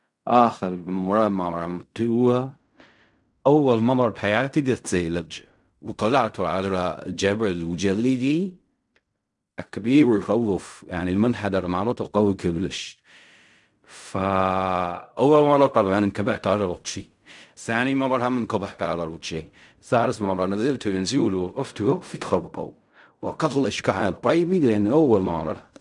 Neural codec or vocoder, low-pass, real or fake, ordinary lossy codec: codec, 16 kHz in and 24 kHz out, 0.4 kbps, LongCat-Audio-Codec, fine tuned four codebook decoder; 10.8 kHz; fake; none